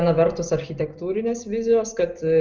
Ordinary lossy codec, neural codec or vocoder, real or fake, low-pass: Opus, 24 kbps; none; real; 7.2 kHz